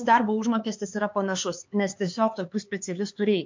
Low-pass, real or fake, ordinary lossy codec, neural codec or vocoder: 7.2 kHz; fake; MP3, 48 kbps; codec, 16 kHz, 4 kbps, X-Codec, HuBERT features, trained on LibriSpeech